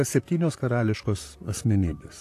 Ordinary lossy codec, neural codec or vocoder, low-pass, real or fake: AAC, 64 kbps; vocoder, 44.1 kHz, 128 mel bands, Pupu-Vocoder; 14.4 kHz; fake